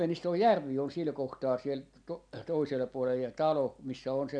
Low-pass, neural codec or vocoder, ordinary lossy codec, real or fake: 9.9 kHz; none; none; real